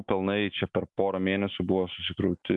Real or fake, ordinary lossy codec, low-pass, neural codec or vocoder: real; MP3, 64 kbps; 10.8 kHz; none